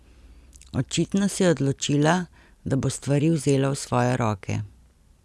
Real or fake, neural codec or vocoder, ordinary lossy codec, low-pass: real; none; none; none